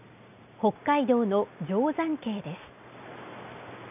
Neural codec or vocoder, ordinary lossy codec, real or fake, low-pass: none; none; real; 3.6 kHz